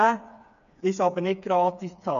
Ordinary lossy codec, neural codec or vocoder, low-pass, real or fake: none; codec, 16 kHz, 4 kbps, FreqCodec, smaller model; 7.2 kHz; fake